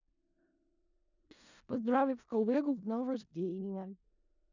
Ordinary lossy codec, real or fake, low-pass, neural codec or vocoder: none; fake; 7.2 kHz; codec, 16 kHz in and 24 kHz out, 0.4 kbps, LongCat-Audio-Codec, four codebook decoder